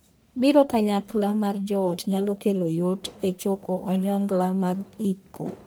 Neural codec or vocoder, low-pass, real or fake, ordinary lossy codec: codec, 44.1 kHz, 1.7 kbps, Pupu-Codec; none; fake; none